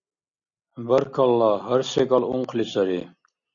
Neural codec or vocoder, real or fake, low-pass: none; real; 7.2 kHz